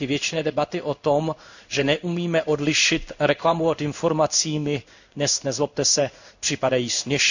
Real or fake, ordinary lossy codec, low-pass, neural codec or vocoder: fake; none; 7.2 kHz; codec, 16 kHz in and 24 kHz out, 1 kbps, XY-Tokenizer